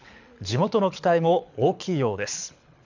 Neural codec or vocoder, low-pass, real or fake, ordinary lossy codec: codec, 24 kHz, 6 kbps, HILCodec; 7.2 kHz; fake; none